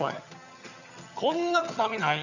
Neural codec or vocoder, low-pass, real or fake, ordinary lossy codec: vocoder, 22.05 kHz, 80 mel bands, HiFi-GAN; 7.2 kHz; fake; none